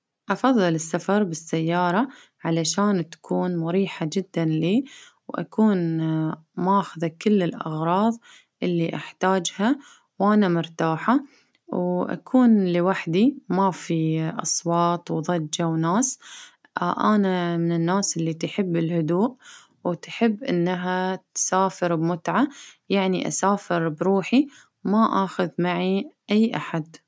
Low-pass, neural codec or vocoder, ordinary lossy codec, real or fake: none; none; none; real